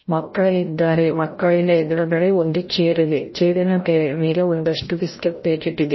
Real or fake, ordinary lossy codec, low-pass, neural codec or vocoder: fake; MP3, 24 kbps; 7.2 kHz; codec, 16 kHz, 0.5 kbps, FreqCodec, larger model